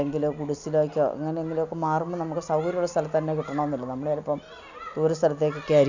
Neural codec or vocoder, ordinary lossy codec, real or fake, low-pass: none; none; real; 7.2 kHz